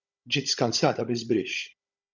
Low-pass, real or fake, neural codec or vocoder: 7.2 kHz; fake; codec, 16 kHz, 16 kbps, FunCodec, trained on Chinese and English, 50 frames a second